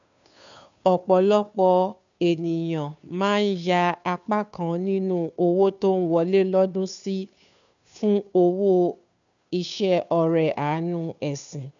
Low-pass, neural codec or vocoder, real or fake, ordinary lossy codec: 7.2 kHz; codec, 16 kHz, 2 kbps, FunCodec, trained on Chinese and English, 25 frames a second; fake; none